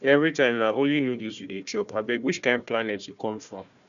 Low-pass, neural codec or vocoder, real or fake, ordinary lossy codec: 7.2 kHz; codec, 16 kHz, 1 kbps, FunCodec, trained on Chinese and English, 50 frames a second; fake; none